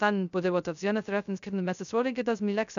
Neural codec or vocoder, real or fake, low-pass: codec, 16 kHz, 0.2 kbps, FocalCodec; fake; 7.2 kHz